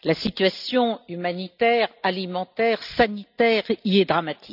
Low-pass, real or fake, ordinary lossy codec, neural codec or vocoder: 5.4 kHz; real; none; none